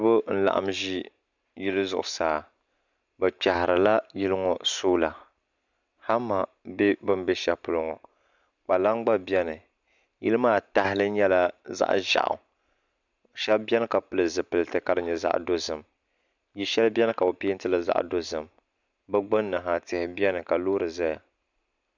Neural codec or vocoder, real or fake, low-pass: none; real; 7.2 kHz